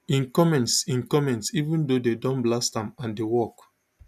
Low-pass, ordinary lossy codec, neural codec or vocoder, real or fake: 14.4 kHz; none; none; real